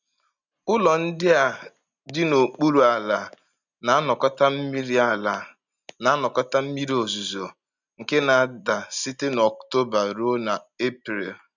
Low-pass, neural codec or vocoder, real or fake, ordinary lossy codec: 7.2 kHz; none; real; none